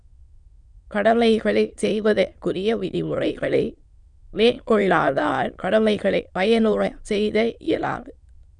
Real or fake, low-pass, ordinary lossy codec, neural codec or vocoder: fake; 9.9 kHz; Opus, 64 kbps; autoencoder, 22.05 kHz, a latent of 192 numbers a frame, VITS, trained on many speakers